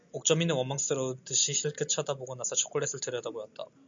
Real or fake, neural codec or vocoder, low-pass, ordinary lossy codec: real; none; 7.2 kHz; MP3, 64 kbps